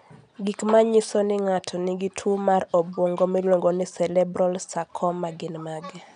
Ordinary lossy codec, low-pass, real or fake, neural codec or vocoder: none; 9.9 kHz; real; none